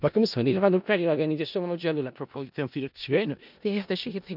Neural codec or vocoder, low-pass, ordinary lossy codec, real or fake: codec, 16 kHz in and 24 kHz out, 0.4 kbps, LongCat-Audio-Codec, four codebook decoder; 5.4 kHz; none; fake